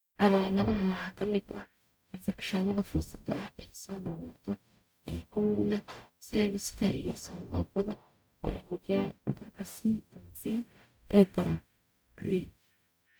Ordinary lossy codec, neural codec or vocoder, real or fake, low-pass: none; codec, 44.1 kHz, 0.9 kbps, DAC; fake; none